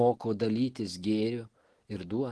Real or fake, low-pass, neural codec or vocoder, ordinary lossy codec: real; 10.8 kHz; none; Opus, 16 kbps